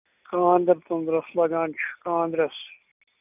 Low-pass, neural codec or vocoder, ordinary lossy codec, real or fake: 3.6 kHz; none; none; real